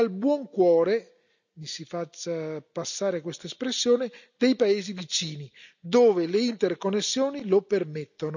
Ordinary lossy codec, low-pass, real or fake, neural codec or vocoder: none; 7.2 kHz; real; none